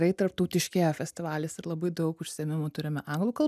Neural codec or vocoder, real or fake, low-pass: none; real; 14.4 kHz